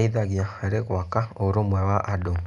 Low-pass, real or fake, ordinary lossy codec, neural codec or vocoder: 10.8 kHz; real; none; none